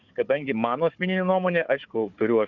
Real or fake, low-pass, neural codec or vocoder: fake; 7.2 kHz; codec, 16 kHz, 4 kbps, FunCodec, trained on Chinese and English, 50 frames a second